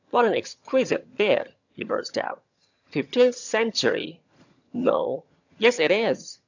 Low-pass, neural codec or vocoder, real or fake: 7.2 kHz; vocoder, 22.05 kHz, 80 mel bands, HiFi-GAN; fake